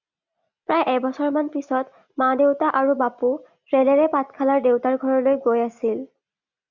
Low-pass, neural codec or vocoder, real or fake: 7.2 kHz; none; real